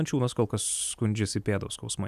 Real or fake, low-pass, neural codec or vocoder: real; 14.4 kHz; none